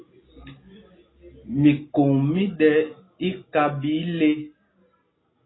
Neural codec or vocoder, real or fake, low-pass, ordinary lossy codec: none; real; 7.2 kHz; AAC, 16 kbps